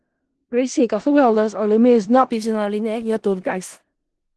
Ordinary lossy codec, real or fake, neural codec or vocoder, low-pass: Opus, 16 kbps; fake; codec, 16 kHz in and 24 kHz out, 0.4 kbps, LongCat-Audio-Codec, four codebook decoder; 10.8 kHz